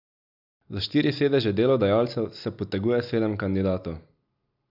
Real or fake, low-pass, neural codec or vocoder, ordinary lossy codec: real; 5.4 kHz; none; none